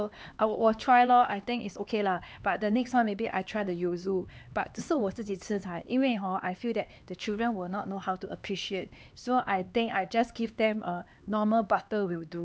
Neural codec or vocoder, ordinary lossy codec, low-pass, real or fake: codec, 16 kHz, 2 kbps, X-Codec, HuBERT features, trained on LibriSpeech; none; none; fake